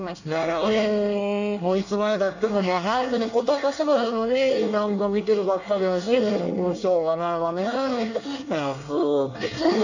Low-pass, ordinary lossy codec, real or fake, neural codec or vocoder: 7.2 kHz; none; fake; codec, 24 kHz, 1 kbps, SNAC